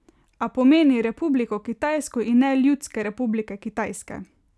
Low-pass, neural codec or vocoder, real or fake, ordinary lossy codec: none; none; real; none